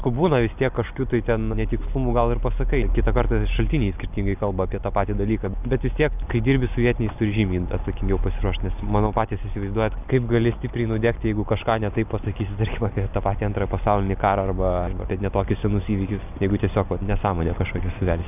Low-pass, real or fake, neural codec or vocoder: 3.6 kHz; real; none